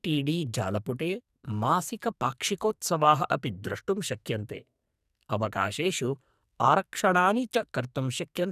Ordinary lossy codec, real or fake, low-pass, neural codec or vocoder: none; fake; 14.4 kHz; codec, 44.1 kHz, 2.6 kbps, SNAC